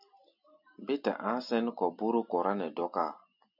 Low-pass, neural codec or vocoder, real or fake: 5.4 kHz; none; real